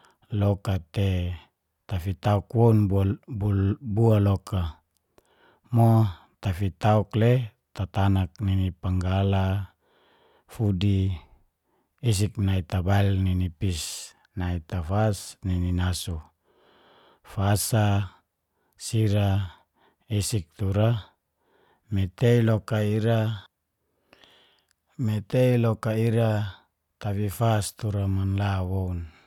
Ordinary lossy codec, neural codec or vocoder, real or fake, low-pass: none; none; real; 19.8 kHz